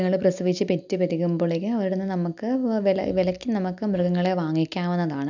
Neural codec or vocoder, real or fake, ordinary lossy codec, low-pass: none; real; none; 7.2 kHz